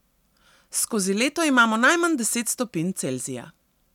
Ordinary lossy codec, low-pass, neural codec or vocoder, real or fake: none; 19.8 kHz; none; real